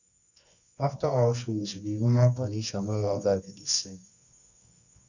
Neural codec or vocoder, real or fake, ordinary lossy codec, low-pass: codec, 24 kHz, 0.9 kbps, WavTokenizer, medium music audio release; fake; none; 7.2 kHz